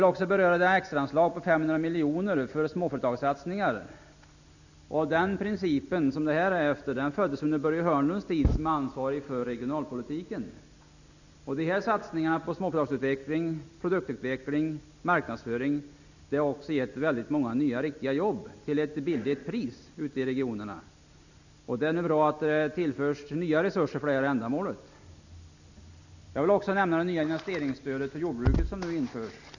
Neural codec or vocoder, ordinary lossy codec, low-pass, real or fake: none; none; 7.2 kHz; real